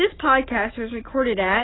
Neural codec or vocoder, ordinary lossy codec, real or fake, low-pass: none; AAC, 16 kbps; real; 7.2 kHz